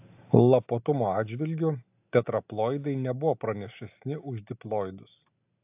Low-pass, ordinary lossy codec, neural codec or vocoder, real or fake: 3.6 kHz; AAC, 32 kbps; none; real